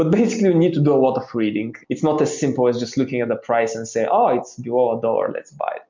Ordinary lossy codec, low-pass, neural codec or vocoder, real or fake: MP3, 64 kbps; 7.2 kHz; none; real